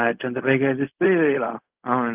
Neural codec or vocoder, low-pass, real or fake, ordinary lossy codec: codec, 16 kHz, 0.4 kbps, LongCat-Audio-Codec; 3.6 kHz; fake; Opus, 24 kbps